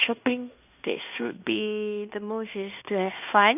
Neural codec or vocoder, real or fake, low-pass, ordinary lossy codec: codec, 16 kHz in and 24 kHz out, 0.9 kbps, LongCat-Audio-Codec, fine tuned four codebook decoder; fake; 3.6 kHz; none